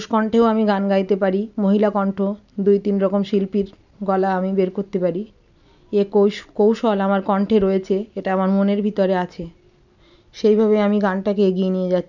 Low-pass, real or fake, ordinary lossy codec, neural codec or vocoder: 7.2 kHz; real; none; none